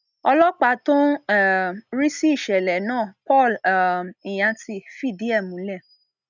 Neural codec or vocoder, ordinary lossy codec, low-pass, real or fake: none; none; 7.2 kHz; real